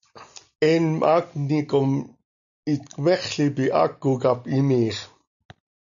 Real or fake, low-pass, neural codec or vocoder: real; 7.2 kHz; none